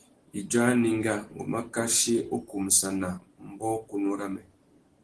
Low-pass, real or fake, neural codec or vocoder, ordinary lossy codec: 10.8 kHz; real; none; Opus, 16 kbps